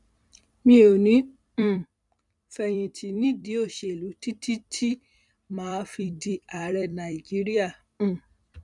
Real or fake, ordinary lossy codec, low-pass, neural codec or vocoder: fake; none; 10.8 kHz; vocoder, 44.1 kHz, 128 mel bands every 256 samples, BigVGAN v2